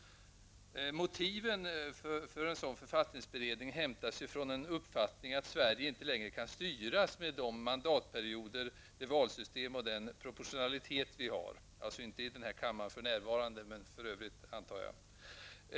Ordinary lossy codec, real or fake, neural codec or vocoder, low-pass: none; real; none; none